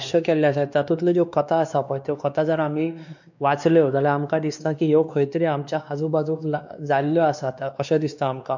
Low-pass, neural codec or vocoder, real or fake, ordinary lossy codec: 7.2 kHz; codec, 16 kHz, 2 kbps, X-Codec, WavLM features, trained on Multilingual LibriSpeech; fake; none